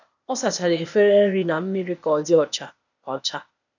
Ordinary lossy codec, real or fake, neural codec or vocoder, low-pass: none; fake; codec, 16 kHz, 0.8 kbps, ZipCodec; 7.2 kHz